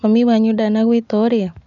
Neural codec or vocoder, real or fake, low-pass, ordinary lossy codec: none; real; 7.2 kHz; none